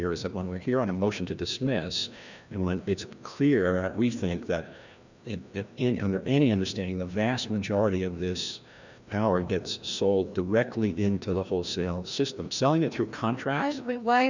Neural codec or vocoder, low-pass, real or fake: codec, 16 kHz, 1 kbps, FreqCodec, larger model; 7.2 kHz; fake